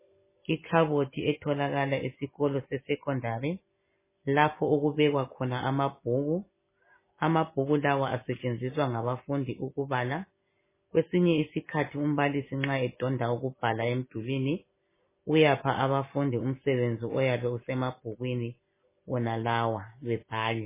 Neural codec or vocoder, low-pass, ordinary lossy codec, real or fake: none; 3.6 kHz; MP3, 16 kbps; real